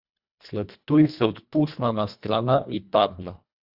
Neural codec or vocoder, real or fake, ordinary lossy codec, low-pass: codec, 24 kHz, 1.5 kbps, HILCodec; fake; Opus, 64 kbps; 5.4 kHz